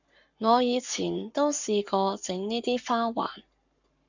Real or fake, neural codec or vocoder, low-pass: fake; codec, 44.1 kHz, 7.8 kbps, Pupu-Codec; 7.2 kHz